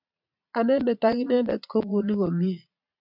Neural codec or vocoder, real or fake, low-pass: vocoder, 22.05 kHz, 80 mel bands, Vocos; fake; 5.4 kHz